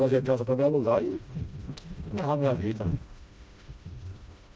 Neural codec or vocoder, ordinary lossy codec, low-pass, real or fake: codec, 16 kHz, 1 kbps, FreqCodec, smaller model; none; none; fake